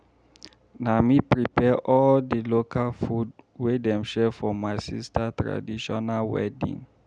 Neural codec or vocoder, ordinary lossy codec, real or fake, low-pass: none; none; real; 9.9 kHz